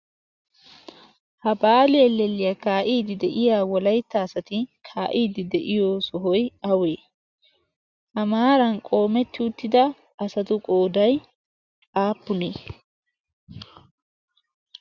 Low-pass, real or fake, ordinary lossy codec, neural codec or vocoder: 7.2 kHz; real; Opus, 64 kbps; none